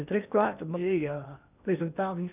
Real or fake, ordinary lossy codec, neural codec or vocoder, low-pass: fake; none; codec, 16 kHz in and 24 kHz out, 0.8 kbps, FocalCodec, streaming, 65536 codes; 3.6 kHz